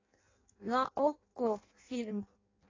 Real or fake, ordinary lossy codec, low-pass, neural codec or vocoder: fake; AAC, 32 kbps; 7.2 kHz; codec, 16 kHz in and 24 kHz out, 0.6 kbps, FireRedTTS-2 codec